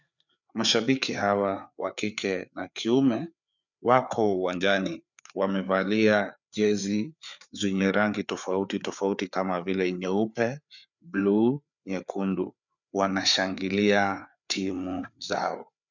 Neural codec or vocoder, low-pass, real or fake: codec, 16 kHz, 4 kbps, FreqCodec, larger model; 7.2 kHz; fake